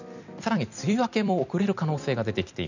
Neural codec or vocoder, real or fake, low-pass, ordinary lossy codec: vocoder, 44.1 kHz, 128 mel bands every 256 samples, BigVGAN v2; fake; 7.2 kHz; none